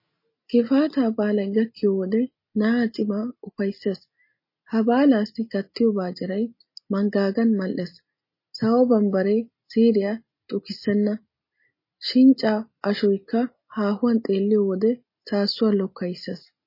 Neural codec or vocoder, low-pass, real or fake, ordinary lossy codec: none; 5.4 kHz; real; MP3, 24 kbps